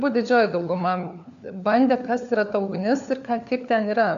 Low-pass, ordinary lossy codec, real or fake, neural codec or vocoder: 7.2 kHz; AAC, 96 kbps; fake; codec, 16 kHz, 4 kbps, FunCodec, trained on LibriTTS, 50 frames a second